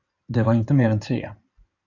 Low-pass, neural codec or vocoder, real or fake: 7.2 kHz; codec, 16 kHz in and 24 kHz out, 2.2 kbps, FireRedTTS-2 codec; fake